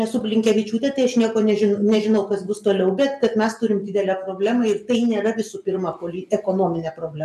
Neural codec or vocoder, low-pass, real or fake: none; 14.4 kHz; real